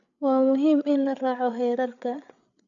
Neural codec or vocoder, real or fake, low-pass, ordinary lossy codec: codec, 16 kHz, 8 kbps, FreqCodec, larger model; fake; 7.2 kHz; none